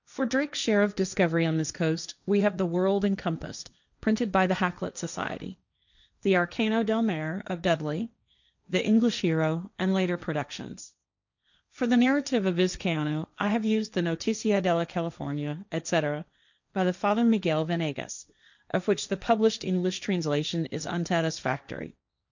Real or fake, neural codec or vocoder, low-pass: fake; codec, 16 kHz, 1.1 kbps, Voila-Tokenizer; 7.2 kHz